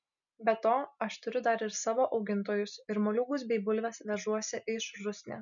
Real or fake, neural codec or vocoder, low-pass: real; none; 7.2 kHz